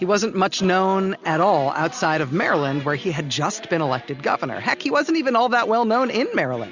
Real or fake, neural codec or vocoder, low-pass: real; none; 7.2 kHz